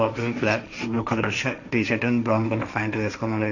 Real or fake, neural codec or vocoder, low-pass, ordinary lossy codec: fake; codec, 16 kHz, 1.1 kbps, Voila-Tokenizer; 7.2 kHz; none